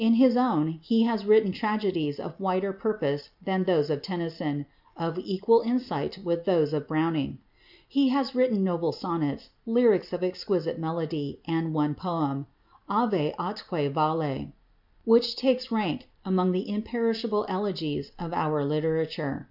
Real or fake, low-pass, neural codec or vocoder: real; 5.4 kHz; none